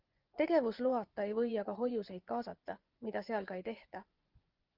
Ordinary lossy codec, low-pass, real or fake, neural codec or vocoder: Opus, 32 kbps; 5.4 kHz; fake; vocoder, 24 kHz, 100 mel bands, Vocos